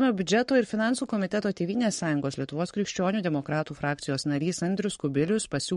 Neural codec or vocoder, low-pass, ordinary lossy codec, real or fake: codec, 44.1 kHz, 7.8 kbps, Pupu-Codec; 19.8 kHz; MP3, 48 kbps; fake